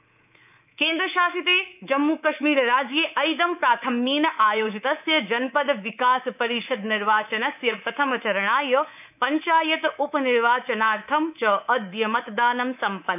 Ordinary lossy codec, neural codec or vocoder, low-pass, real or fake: none; codec, 24 kHz, 3.1 kbps, DualCodec; 3.6 kHz; fake